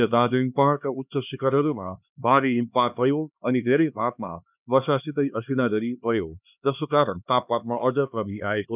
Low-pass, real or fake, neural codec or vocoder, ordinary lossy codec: 3.6 kHz; fake; codec, 16 kHz, 2 kbps, X-Codec, HuBERT features, trained on LibriSpeech; none